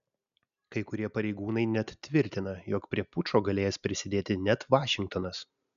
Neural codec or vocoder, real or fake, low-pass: none; real; 7.2 kHz